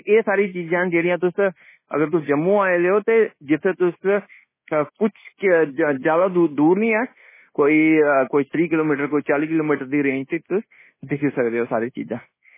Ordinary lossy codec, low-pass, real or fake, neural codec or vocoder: MP3, 16 kbps; 3.6 kHz; fake; codec, 24 kHz, 1.2 kbps, DualCodec